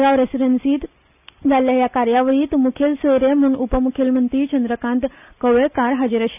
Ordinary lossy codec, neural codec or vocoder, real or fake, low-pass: none; none; real; 3.6 kHz